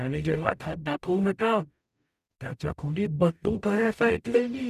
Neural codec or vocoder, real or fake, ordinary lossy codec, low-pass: codec, 44.1 kHz, 0.9 kbps, DAC; fake; none; 14.4 kHz